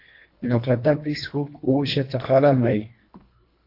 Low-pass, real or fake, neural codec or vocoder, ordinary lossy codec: 5.4 kHz; fake; codec, 24 kHz, 1.5 kbps, HILCodec; AAC, 32 kbps